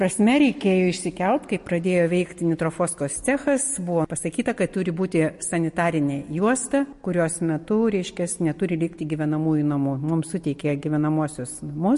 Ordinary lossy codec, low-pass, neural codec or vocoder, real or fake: MP3, 48 kbps; 14.4 kHz; none; real